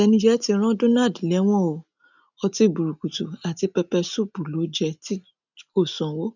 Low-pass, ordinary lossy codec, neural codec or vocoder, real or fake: 7.2 kHz; none; none; real